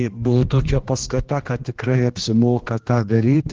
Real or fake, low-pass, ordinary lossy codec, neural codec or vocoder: fake; 7.2 kHz; Opus, 16 kbps; codec, 16 kHz, 1 kbps, X-Codec, HuBERT features, trained on general audio